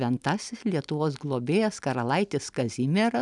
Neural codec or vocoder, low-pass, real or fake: none; 10.8 kHz; real